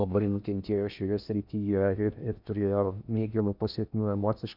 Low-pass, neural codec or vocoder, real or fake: 5.4 kHz; codec, 16 kHz in and 24 kHz out, 0.6 kbps, FocalCodec, streaming, 4096 codes; fake